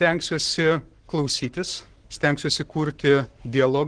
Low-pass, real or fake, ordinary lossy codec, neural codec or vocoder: 9.9 kHz; fake; Opus, 16 kbps; codec, 44.1 kHz, 7.8 kbps, Pupu-Codec